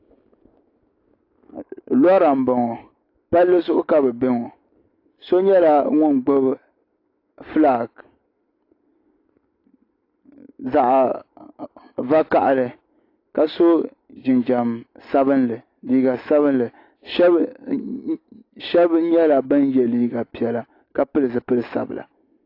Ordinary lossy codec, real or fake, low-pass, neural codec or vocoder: AAC, 32 kbps; real; 5.4 kHz; none